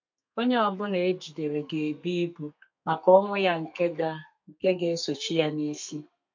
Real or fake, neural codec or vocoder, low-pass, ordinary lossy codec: fake; codec, 32 kHz, 1.9 kbps, SNAC; 7.2 kHz; MP3, 48 kbps